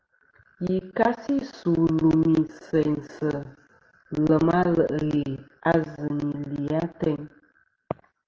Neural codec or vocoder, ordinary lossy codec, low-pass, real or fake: none; Opus, 16 kbps; 7.2 kHz; real